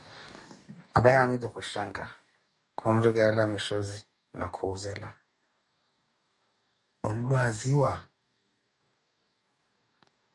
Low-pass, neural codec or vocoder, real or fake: 10.8 kHz; codec, 44.1 kHz, 2.6 kbps, DAC; fake